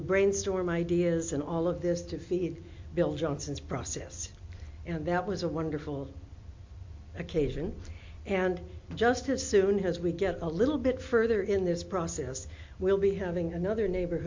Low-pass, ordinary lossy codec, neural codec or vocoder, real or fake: 7.2 kHz; MP3, 48 kbps; none; real